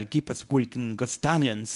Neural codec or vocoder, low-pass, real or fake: codec, 24 kHz, 0.9 kbps, WavTokenizer, medium speech release version 1; 10.8 kHz; fake